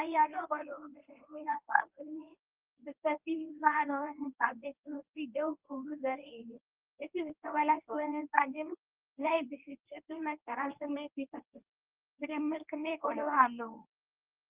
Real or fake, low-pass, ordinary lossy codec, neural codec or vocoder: fake; 3.6 kHz; Opus, 24 kbps; codec, 24 kHz, 0.9 kbps, WavTokenizer, medium speech release version 1